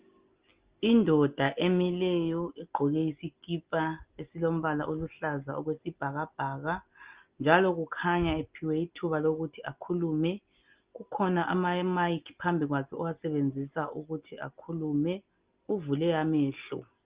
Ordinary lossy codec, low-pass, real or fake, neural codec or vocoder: Opus, 32 kbps; 3.6 kHz; real; none